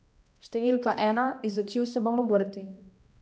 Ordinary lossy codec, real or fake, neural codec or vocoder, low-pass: none; fake; codec, 16 kHz, 1 kbps, X-Codec, HuBERT features, trained on balanced general audio; none